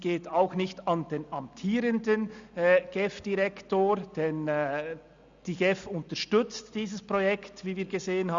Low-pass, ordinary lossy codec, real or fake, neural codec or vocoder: 7.2 kHz; Opus, 64 kbps; real; none